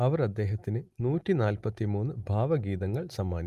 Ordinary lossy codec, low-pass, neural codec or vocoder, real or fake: Opus, 32 kbps; 14.4 kHz; none; real